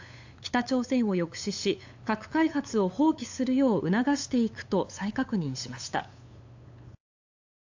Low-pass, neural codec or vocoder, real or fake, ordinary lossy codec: 7.2 kHz; codec, 16 kHz, 8 kbps, FunCodec, trained on LibriTTS, 25 frames a second; fake; AAC, 48 kbps